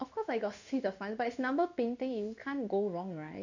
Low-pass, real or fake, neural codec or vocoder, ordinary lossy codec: 7.2 kHz; fake; codec, 16 kHz in and 24 kHz out, 1 kbps, XY-Tokenizer; none